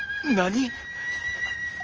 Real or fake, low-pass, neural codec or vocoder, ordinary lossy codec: real; 7.2 kHz; none; Opus, 24 kbps